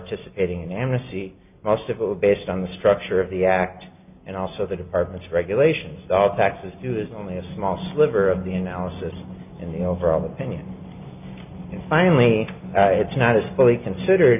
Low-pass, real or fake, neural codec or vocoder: 3.6 kHz; real; none